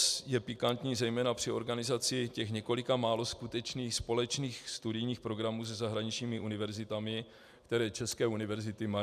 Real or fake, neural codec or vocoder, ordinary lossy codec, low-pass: real; none; AAC, 96 kbps; 14.4 kHz